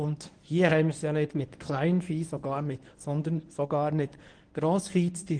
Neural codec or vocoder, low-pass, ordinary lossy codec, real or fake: codec, 24 kHz, 0.9 kbps, WavTokenizer, medium speech release version 1; 9.9 kHz; Opus, 24 kbps; fake